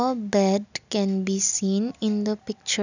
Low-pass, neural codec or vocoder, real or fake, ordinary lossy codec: 7.2 kHz; none; real; none